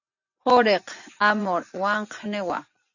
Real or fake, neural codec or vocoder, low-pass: fake; vocoder, 24 kHz, 100 mel bands, Vocos; 7.2 kHz